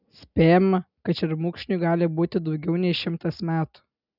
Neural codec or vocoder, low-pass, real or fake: none; 5.4 kHz; real